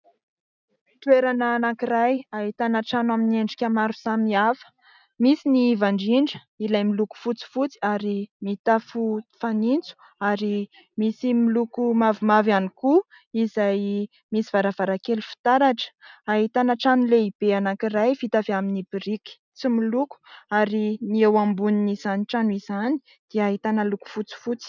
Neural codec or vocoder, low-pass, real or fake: none; 7.2 kHz; real